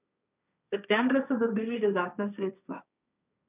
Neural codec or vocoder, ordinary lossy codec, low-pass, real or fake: codec, 16 kHz, 1.1 kbps, Voila-Tokenizer; none; 3.6 kHz; fake